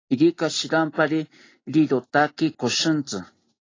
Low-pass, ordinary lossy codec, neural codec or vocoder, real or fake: 7.2 kHz; AAC, 32 kbps; none; real